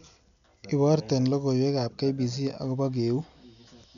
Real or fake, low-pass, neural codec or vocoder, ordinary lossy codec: real; 7.2 kHz; none; none